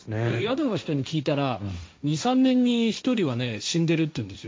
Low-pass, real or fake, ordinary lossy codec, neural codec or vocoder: none; fake; none; codec, 16 kHz, 1.1 kbps, Voila-Tokenizer